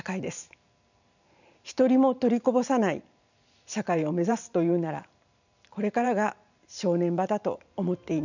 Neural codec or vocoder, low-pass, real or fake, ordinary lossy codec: vocoder, 44.1 kHz, 128 mel bands every 512 samples, BigVGAN v2; 7.2 kHz; fake; none